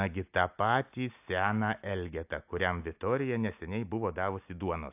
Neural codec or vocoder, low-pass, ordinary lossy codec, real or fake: none; 3.6 kHz; AAC, 32 kbps; real